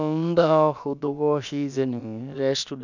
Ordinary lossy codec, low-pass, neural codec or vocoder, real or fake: none; 7.2 kHz; codec, 16 kHz, about 1 kbps, DyCAST, with the encoder's durations; fake